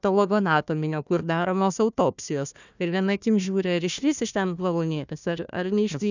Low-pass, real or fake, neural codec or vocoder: 7.2 kHz; fake; codec, 16 kHz, 1 kbps, FunCodec, trained on Chinese and English, 50 frames a second